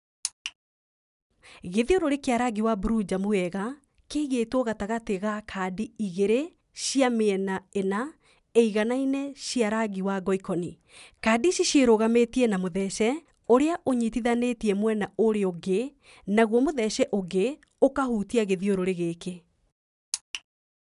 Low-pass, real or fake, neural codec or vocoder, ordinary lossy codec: 10.8 kHz; real; none; none